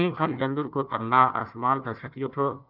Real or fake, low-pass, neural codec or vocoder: fake; 5.4 kHz; codec, 16 kHz, 1 kbps, FunCodec, trained on Chinese and English, 50 frames a second